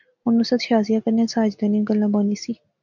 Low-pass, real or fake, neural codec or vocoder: 7.2 kHz; real; none